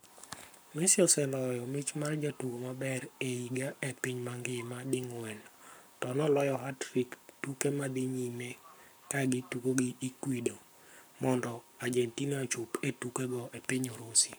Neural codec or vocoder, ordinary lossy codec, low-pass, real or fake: codec, 44.1 kHz, 7.8 kbps, Pupu-Codec; none; none; fake